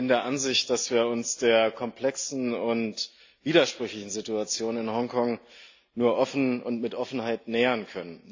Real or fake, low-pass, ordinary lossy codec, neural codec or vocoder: real; 7.2 kHz; AAC, 48 kbps; none